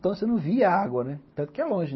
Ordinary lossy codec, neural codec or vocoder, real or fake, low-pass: MP3, 24 kbps; none; real; 7.2 kHz